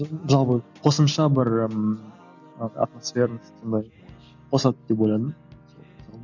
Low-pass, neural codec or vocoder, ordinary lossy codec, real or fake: 7.2 kHz; none; none; real